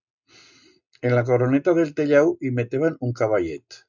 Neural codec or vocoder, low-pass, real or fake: none; 7.2 kHz; real